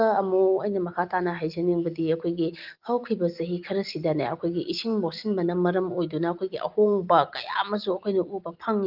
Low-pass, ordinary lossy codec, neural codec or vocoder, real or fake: 5.4 kHz; Opus, 32 kbps; none; real